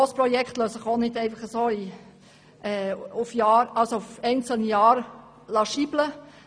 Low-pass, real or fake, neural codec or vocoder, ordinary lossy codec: none; real; none; none